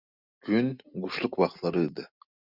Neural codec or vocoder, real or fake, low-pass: none; real; 5.4 kHz